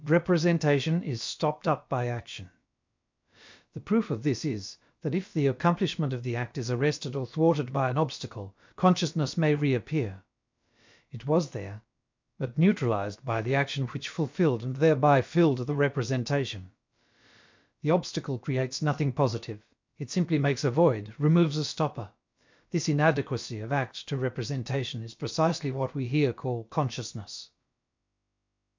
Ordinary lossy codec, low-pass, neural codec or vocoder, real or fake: MP3, 64 kbps; 7.2 kHz; codec, 16 kHz, about 1 kbps, DyCAST, with the encoder's durations; fake